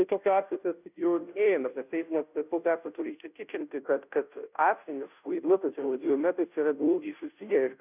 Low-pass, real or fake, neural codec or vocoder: 3.6 kHz; fake; codec, 16 kHz, 0.5 kbps, FunCodec, trained on Chinese and English, 25 frames a second